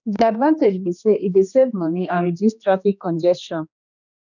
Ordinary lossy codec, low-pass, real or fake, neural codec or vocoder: none; 7.2 kHz; fake; codec, 16 kHz, 2 kbps, X-Codec, HuBERT features, trained on general audio